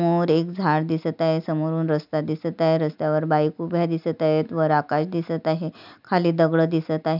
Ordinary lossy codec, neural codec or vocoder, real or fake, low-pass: none; none; real; 5.4 kHz